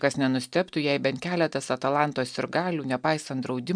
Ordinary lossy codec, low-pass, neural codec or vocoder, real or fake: MP3, 96 kbps; 9.9 kHz; none; real